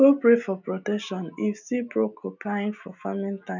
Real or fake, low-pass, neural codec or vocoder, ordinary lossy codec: real; 7.2 kHz; none; none